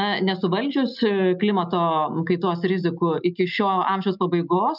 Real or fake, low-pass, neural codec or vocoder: real; 5.4 kHz; none